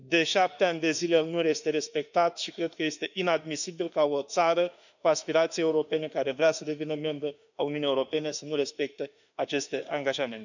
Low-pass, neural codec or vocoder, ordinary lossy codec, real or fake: 7.2 kHz; autoencoder, 48 kHz, 32 numbers a frame, DAC-VAE, trained on Japanese speech; none; fake